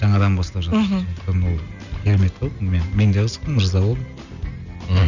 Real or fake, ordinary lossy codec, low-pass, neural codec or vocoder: real; none; 7.2 kHz; none